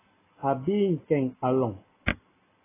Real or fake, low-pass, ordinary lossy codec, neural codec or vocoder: real; 3.6 kHz; AAC, 16 kbps; none